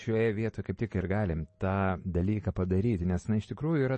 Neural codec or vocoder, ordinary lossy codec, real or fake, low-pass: none; MP3, 32 kbps; real; 10.8 kHz